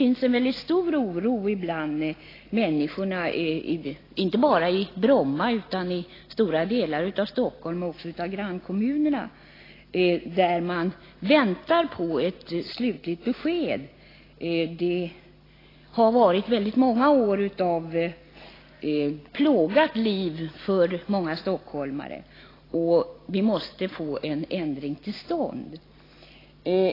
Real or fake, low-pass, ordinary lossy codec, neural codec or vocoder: real; 5.4 kHz; AAC, 24 kbps; none